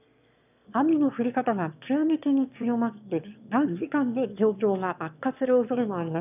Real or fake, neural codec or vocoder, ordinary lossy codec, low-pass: fake; autoencoder, 22.05 kHz, a latent of 192 numbers a frame, VITS, trained on one speaker; none; 3.6 kHz